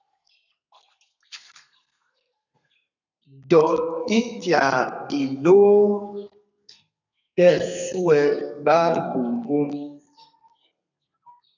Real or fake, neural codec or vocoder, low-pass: fake; codec, 32 kHz, 1.9 kbps, SNAC; 7.2 kHz